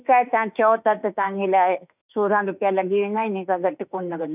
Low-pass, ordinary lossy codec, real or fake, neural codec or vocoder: 3.6 kHz; none; fake; autoencoder, 48 kHz, 32 numbers a frame, DAC-VAE, trained on Japanese speech